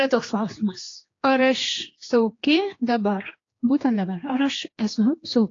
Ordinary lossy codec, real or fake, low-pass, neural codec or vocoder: AAC, 32 kbps; fake; 7.2 kHz; codec, 16 kHz, 2 kbps, X-Codec, HuBERT features, trained on general audio